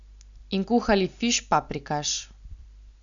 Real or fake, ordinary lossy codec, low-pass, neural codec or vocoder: real; none; 7.2 kHz; none